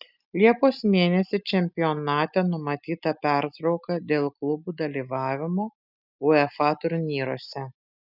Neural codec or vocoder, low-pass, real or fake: none; 5.4 kHz; real